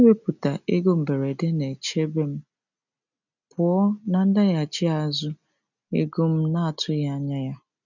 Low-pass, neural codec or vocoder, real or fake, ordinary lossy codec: 7.2 kHz; none; real; none